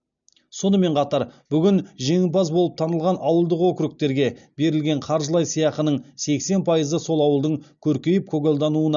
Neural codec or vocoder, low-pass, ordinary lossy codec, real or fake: none; 7.2 kHz; none; real